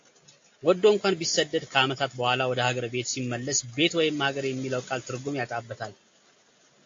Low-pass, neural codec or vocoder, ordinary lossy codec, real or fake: 7.2 kHz; none; AAC, 48 kbps; real